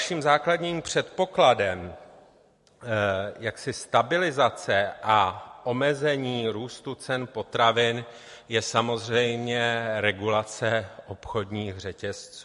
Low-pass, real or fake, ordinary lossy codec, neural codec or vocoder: 14.4 kHz; real; MP3, 48 kbps; none